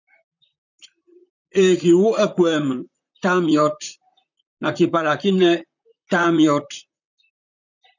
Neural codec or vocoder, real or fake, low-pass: vocoder, 44.1 kHz, 128 mel bands, Pupu-Vocoder; fake; 7.2 kHz